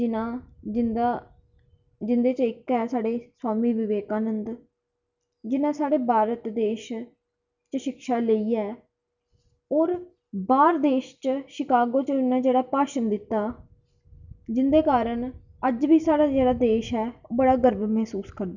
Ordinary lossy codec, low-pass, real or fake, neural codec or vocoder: none; 7.2 kHz; real; none